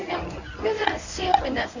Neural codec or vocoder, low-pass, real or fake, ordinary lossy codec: codec, 24 kHz, 0.9 kbps, WavTokenizer, medium speech release version 1; 7.2 kHz; fake; none